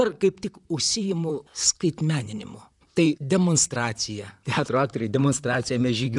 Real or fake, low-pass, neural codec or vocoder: fake; 10.8 kHz; vocoder, 44.1 kHz, 128 mel bands, Pupu-Vocoder